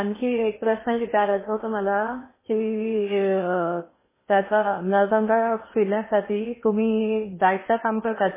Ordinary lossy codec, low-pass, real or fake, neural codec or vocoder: MP3, 16 kbps; 3.6 kHz; fake; codec, 16 kHz in and 24 kHz out, 0.8 kbps, FocalCodec, streaming, 65536 codes